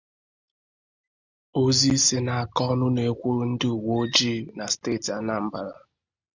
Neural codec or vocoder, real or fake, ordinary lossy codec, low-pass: none; real; Opus, 64 kbps; 7.2 kHz